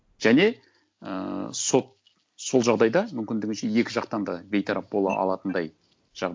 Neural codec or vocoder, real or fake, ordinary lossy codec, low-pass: none; real; none; none